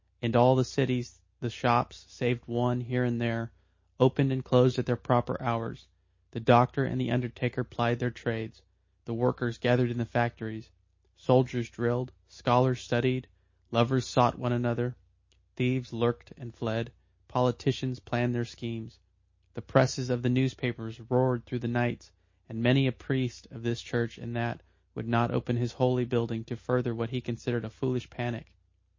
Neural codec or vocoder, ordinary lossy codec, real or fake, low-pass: none; MP3, 32 kbps; real; 7.2 kHz